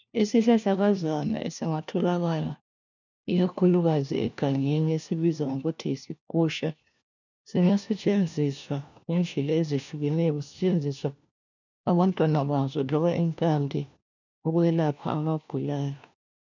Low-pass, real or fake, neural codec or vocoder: 7.2 kHz; fake; codec, 16 kHz, 1 kbps, FunCodec, trained on LibriTTS, 50 frames a second